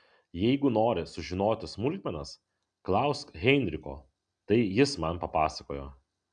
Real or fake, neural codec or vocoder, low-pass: real; none; 9.9 kHz